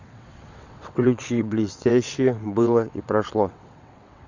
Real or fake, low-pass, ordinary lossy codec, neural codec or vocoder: fake; 7.2 kHz; Opus, 64 kbps; vocoder, 22.05 kHz, 80 mel bands, WaveNeXt